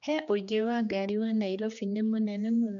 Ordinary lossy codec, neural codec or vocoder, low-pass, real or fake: none; codec, 16 kHz, 2 kbps, X-Codec, HuBERT features, trained on general audio; 7.2 kHz; fake